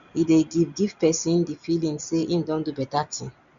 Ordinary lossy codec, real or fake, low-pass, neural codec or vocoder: none; real; 7.2 kHz; none